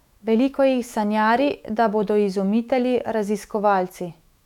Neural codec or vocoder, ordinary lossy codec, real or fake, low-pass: autoencoder, 48 kHz, 128 numbers a frame, DAC-VAE, trained on Japanese speech; none; fake; 19.8 kHz